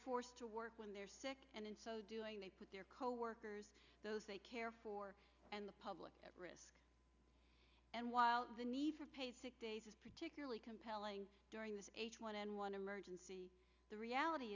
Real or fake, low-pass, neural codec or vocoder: real; 7.2 kHz; none